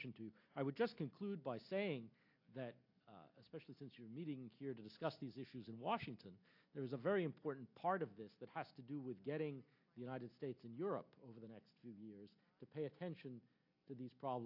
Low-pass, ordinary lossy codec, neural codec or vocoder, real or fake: 5.4 kHz; AAC, 48 kbps; none; real